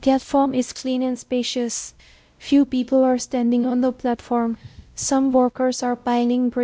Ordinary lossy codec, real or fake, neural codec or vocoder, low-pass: none; fake; codec, 16 kHz, 0.5 kbps, X-Codec, WavLM features, trained on Multilingual LibriSpeech; none